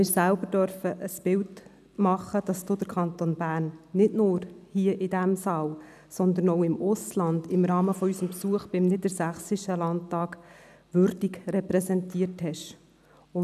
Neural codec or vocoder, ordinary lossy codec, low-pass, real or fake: none; none; 14.4 kHz; real